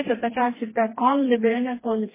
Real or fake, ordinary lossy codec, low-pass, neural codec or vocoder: fake; MP3, 16 kbps; 3.6 kHz; codec, 16 kHz, 2 kbps, FreqCodec, smaller model